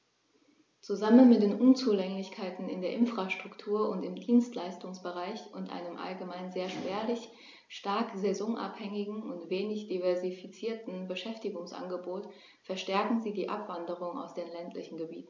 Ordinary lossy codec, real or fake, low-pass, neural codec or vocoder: none; real; none; none